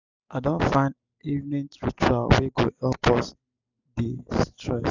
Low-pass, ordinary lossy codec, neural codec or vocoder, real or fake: 7.2 kHz; none; none; real